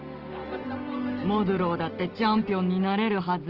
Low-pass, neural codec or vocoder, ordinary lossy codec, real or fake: 5.4 kHz; none; Opus, 16 kbps; real